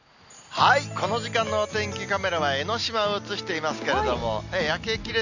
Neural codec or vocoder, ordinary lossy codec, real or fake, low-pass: none; none; real; 7.2 kHz